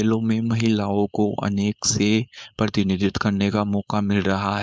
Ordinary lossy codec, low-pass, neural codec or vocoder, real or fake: none; none; codec, 16 kHz, 4.8 kbps, FACodec; fake